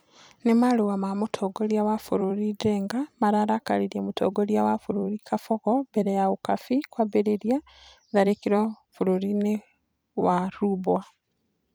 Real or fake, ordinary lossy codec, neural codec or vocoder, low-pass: fake; none; vocoder, 44.1 kHz, 128 mel bands every 256 samples, BigVGAN v2; none